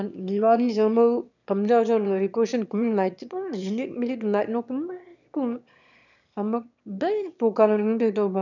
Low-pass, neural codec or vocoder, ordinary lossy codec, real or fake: 7.2 kHz; autoencoder, 22.05 kHz, a latent of 192 numbers a frame, VITS, trained on one speaker; none; fake